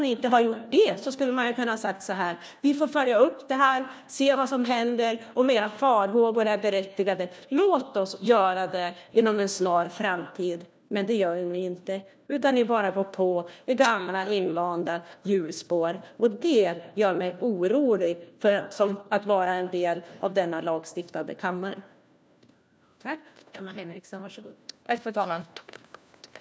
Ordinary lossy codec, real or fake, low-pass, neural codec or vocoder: none; fake; none; codec, 16 kHz, 1 kbps, FunCodec, trained on LibriTTS, 50 frames a second